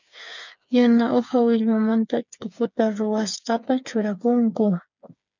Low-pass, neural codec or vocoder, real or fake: 7.2 kHz; codec, 16 kHz, 8 kbps, FreqCodec, smaller model; fake